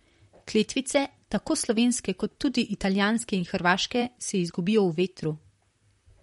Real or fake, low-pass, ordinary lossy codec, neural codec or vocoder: fake; 19.8 kHz; MP3, 48 kbps; vocoder, 44.1 kHz, 128 mel bands, Pupu-Vocoder